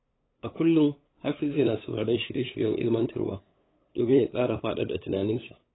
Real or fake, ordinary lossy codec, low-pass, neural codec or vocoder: fake; AAC, 16 kbps; 7.2 kHz; codec, 16 kHz, 8 kbps, FunCodec, trained on LibriTTS, 25 frames a second